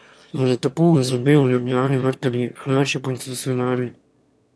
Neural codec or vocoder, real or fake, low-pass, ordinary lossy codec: autoencoder, 22.05 kHz, a latent of 192 numbers a frame, VITS, trained on one speaker; fake; none; none